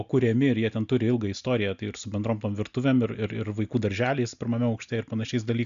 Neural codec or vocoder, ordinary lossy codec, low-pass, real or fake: none; AAC, 96 kbps; 7.2 kHz; real